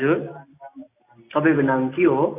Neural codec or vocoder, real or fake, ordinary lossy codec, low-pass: none; real; AAC, 24 kbps; 3.6 kHz